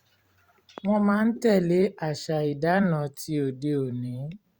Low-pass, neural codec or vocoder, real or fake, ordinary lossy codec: 19.8 kHz; vocoder, 44.1 kHz, 128 mel bands every 512 samples, BigVGAN v2; fake; Opus, 64 kbps